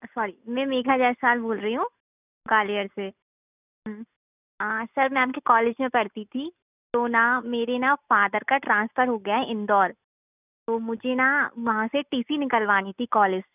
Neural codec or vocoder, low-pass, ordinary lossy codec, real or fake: none; 3.6 kHz; none; real